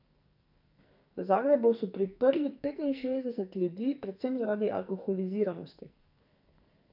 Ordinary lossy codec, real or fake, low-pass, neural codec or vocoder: none; fake; 5.4 kHz; codec, 44.1 kHz, 2.6 kbps, SNAC